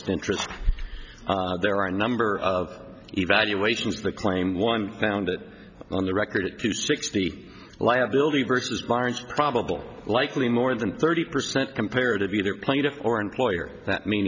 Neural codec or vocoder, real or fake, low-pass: none; real; 7.2 kHz